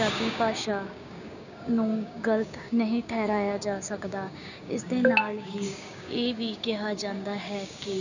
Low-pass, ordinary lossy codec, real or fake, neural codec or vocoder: 7.2 kHz; none; fake; codec, 16 kHz, 6 kbps, DAC